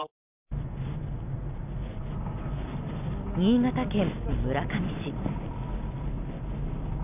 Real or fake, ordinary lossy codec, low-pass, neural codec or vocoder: real; none; 3.6 kHz; none